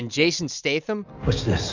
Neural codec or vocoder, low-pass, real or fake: none; 7.2 kHz; real